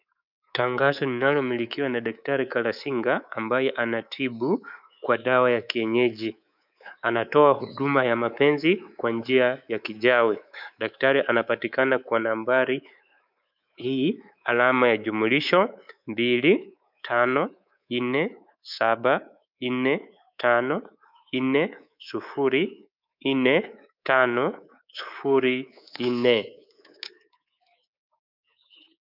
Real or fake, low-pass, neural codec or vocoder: fake; 5.4 kHz; codec, 24 kHz, 3.1 kbps, DualCodec